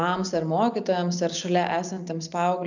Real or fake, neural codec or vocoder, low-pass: real; none; 7.2 kHz